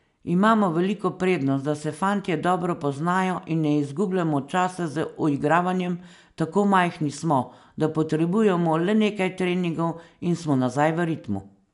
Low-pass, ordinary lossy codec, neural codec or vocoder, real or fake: 10.8 kHz; none; none; real